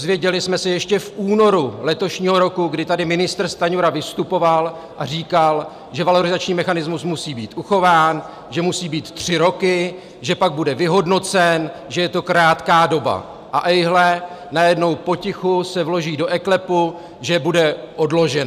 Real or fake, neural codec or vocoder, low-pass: real; none; 14.4 kHz